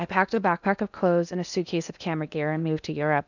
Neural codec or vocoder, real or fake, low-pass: codec, 16 kHz in and 24 kHz out, 0.8 kbps, FocalCodec, streaming, 65536 codes; fake; 7.2 kHz